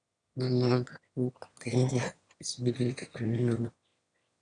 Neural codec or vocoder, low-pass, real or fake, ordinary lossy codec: autoencoder, 22.05 kHz, a latent of 192 numbers a frame, VITS, trained on one speaker; 9.9 kHz; fake; none